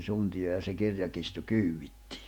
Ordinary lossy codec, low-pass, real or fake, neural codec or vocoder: none; 19.8 kHz; fake; vocoder, 48 kHz, 128 mel bands, Vocos